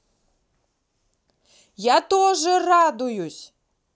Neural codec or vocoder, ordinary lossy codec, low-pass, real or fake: none; none; none; real